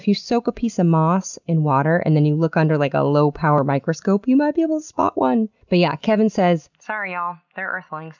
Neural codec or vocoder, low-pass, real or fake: none; 7.2 kHz; real